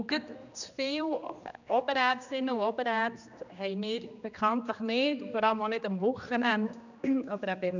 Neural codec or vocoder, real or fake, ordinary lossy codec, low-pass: codec, 16 kHz, 2 kbps, X-Codec, HuBERT features, trained on general audio; fake; none; 7.2 kHz